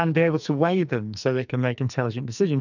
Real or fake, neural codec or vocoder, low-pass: fake; codec, 44.1 kHz, 2.6 kbps, SNAC; 7.2 kHz